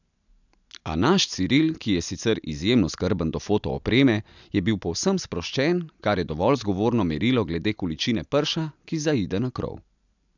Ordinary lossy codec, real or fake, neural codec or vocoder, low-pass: none; real; none; 7.2 kHz